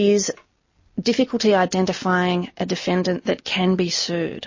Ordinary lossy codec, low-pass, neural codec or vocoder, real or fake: MP3, 32 kbps; 7.2 kHz; none; real